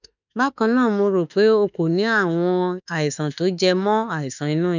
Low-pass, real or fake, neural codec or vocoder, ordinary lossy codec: 7.2 kHz; fake; autoencoder, 48 kHz, 32 numbers a frame, DAC-VAE, trained on Japanese speech; none